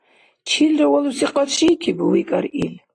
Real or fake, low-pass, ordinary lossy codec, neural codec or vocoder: real; 9.9 kHz; AAC, 32 kbps; none